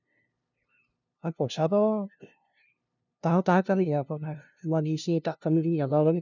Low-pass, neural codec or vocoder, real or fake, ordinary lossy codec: 7.2 kHz; codec, 16 kHz, 0.5 kbps, FunCodec, trained on LibriTTS, 25 frames a second; fake; none